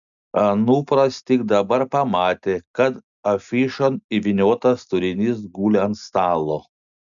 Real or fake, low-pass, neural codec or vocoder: real; 7.2 kHz; none